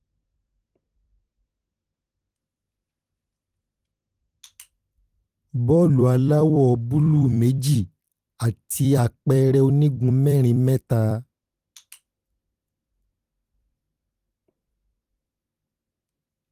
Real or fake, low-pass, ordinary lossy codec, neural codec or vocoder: fake; 14.4 kHz; Opus, 24 kbps; vocoder, 44.1 kHz, 128 mel bands every 256 samples, BigVGAN v2